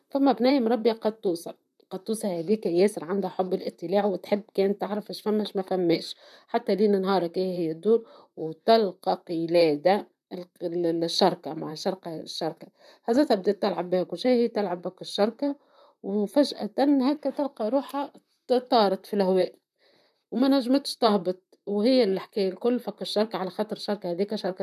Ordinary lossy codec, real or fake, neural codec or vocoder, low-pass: none; fake; vocoder, 44.1 kHz, 128 mel bands, Pupu-Vocoder; 14.4 kHz